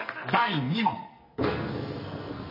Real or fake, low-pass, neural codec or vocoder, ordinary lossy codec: fake; 5.4 kHz; codec, 44.1 kHz, 2.6 kbps, SNAC; MP3, 24 kbps